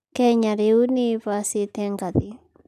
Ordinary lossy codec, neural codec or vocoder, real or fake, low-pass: none; none; real; 14.4 kHz